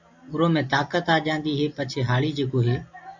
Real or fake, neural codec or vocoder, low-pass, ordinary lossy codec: real; none; 7.2 kHz; MP3, 64 kbps